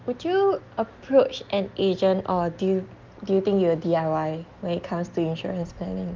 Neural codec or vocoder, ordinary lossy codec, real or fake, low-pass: none; Opus, 24 kbps; real; 7.2 kHz